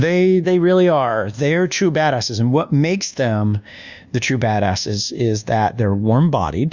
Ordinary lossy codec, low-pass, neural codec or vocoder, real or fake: Opus, 64 kbps; 7.2 kHz; codec, 24 kHz, 1.2 kbps, DualCodec; fake